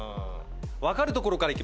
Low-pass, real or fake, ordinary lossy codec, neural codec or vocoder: none; real; none; none